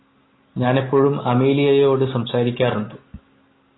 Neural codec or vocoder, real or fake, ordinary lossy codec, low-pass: none; real; AAC, 16 kbps; 7.2 kHz